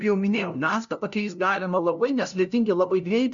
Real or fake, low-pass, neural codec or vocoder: fake; 7.2 kHz; codec, 16 kHz, 0.5 kbps, FunCodec, trained on LibriTTS, 25 frames a second